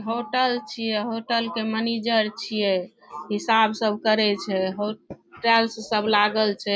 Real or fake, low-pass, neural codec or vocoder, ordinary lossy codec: real; none; none; none